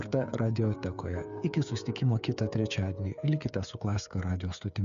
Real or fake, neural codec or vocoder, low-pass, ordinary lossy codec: fake; codec, 16 kHz, 8 kbps, FreqCodec, smaller model; 7.2 kHz; MP3, 96 kbps